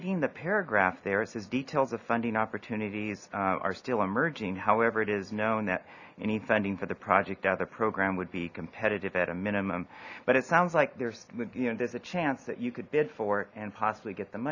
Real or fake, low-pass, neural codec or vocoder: real; 7.2 kHz; none